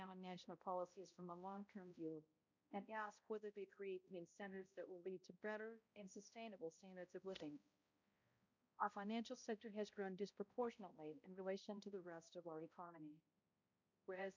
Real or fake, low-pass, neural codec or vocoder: fake; 7.2 kHz; codec, 16 kHz, 0.5 kbps, X-Codec, HuBERT features, trained on balanced general audio